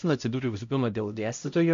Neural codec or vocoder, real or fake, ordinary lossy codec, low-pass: codec, 16 kHz, 0.5 kbps, X-Codec, HuBERT features, trained on LibriSpeech; fake; MP3, 48 kbps; 7.2 kHz